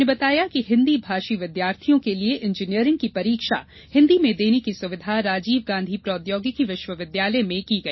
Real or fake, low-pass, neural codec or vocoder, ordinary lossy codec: real; 7.2 kHz; none; MP3, 24 kbps